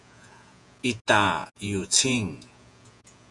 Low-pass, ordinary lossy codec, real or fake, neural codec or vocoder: 10.8 kHz; Opus, 64 kbps; fake; vocoder, 48 kHz, 128 mel bands, Vocos